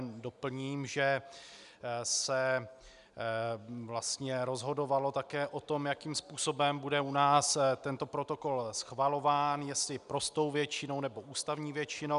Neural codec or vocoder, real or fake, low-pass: none; real; 10.8 kHz